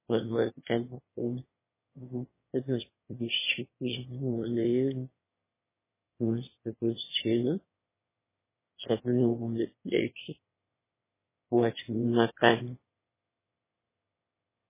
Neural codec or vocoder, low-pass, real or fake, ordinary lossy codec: autoencoder, 22.05 kHz, a latent of 192 numbers a frame, VITS, trained on one speaker; 3.6 kHz; fake; MP3, 16 kbps